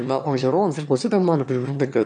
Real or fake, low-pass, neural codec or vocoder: fake; 9.9 kHz; autoencoder, 22.05 kHz, a latent of 192 numbers a frame, VITS, trained on one speaker